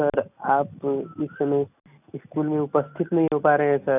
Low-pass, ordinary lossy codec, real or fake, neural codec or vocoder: 3.6 kHz; none; real; none